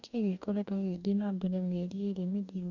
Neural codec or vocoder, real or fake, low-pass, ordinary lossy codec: codec, 44.1 kHz, 2.6 kbps, DAC; fake; 7.2 kHz; none